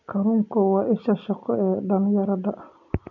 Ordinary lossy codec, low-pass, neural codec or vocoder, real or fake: none; 7.2 kHz; none; real